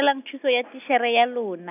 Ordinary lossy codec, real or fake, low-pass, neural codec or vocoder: none; real; 3.6 kHz; none